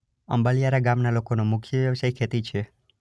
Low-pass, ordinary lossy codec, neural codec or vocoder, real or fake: none; none; none; real